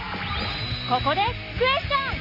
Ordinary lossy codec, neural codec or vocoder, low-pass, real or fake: none; none; 5.4 kHz; real